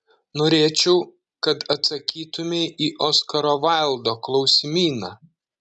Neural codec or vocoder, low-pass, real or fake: none; 10.8 kHz; real